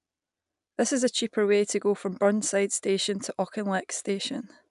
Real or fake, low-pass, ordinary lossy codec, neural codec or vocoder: real; 10.8 kHz; none; none